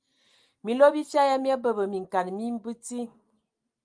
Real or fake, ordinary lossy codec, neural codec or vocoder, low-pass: real; Opus, 32 kbps; none; 9.9 kHz